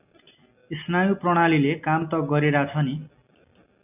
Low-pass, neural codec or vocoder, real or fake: 3.6 kHz; none; real